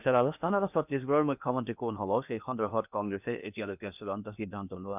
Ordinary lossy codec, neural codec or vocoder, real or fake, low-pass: none; codec, 16 kHz in and 24 kHz out, 0.8 kbps, FocalCodec, streaming, 65536 codes; fake; 3.6 kHz